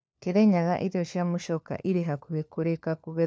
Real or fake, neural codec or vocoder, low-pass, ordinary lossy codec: fake; codec, 16 kHz, 4 kbps, FunCodec, trained on LibriTTS, 50 frames a second; none; none